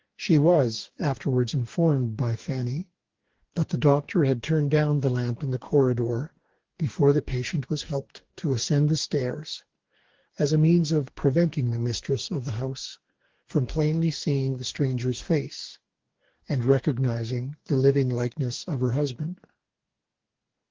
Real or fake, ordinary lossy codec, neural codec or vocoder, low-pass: fake; Opus, 16 kbps; codec, 44.1 kHz, 2.6 kbps, DAC; 7.2 kHz